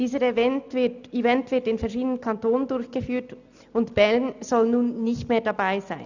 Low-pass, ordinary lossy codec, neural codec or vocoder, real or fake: 7.2 kHz; none; none; real